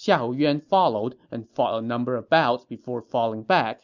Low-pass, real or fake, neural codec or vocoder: 7.2 kHz; real; none